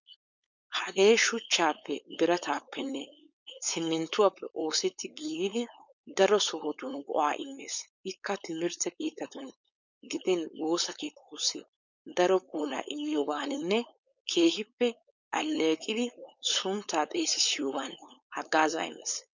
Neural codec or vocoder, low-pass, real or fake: codec, 16 kHz, 4.8 kbps, FACodec; 7.2 kHz; fake